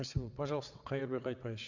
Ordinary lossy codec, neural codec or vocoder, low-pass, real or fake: Opus, 64 kbps; vocoder, 22.05 kHz, 80 mel bands, WaveNeXt; 7.2 kHz; fake